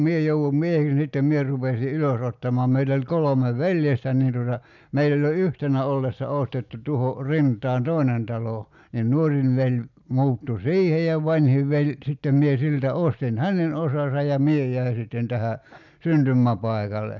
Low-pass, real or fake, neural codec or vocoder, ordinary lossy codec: 7.2 kHz; real; none; none